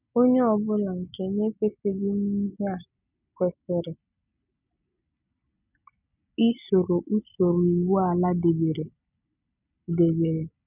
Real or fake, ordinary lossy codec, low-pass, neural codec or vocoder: real; none; 3.6 kHz; none